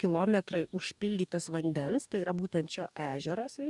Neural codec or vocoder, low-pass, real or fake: codec, 44.1 kHz, 2.6 kbps, DAC; 10.8 kHz; fake